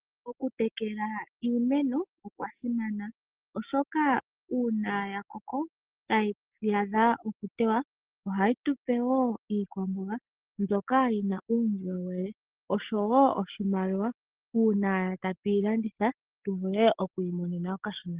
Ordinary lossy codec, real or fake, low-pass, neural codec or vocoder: Opus, 16 kbps; real; 3.6 kHz; none